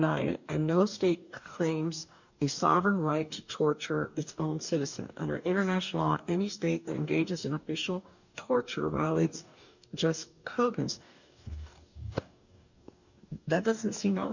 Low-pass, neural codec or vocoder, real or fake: 7.2 kHz; codec, 44.1 kHz, 2.6 kbps, DAC; fake